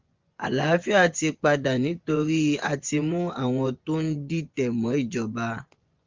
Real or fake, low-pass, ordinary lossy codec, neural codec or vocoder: fake; 7.2 kHz; Opus, 32 kbps; vocoder, 24 kHz, 100 mel bands, Vocos